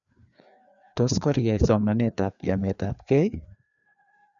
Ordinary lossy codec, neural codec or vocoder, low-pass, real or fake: none; codec, 16 kHz, 2 kbps, FreqCodec, larger model; 7.2 kHz; fake